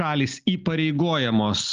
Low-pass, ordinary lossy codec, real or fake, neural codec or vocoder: 7.2 kHz; Opus, 24 kbps; real; none